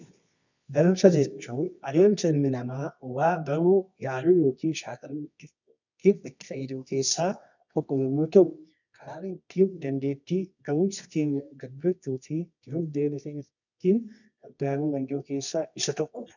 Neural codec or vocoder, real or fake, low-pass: codec, 24 kHz, 0.9 kbps, WavTokenizer, medium music audio release; fake; 7.2 kHz